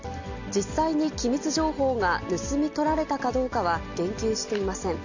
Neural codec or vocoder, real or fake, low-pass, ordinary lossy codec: none; real; 7.2 kHz; none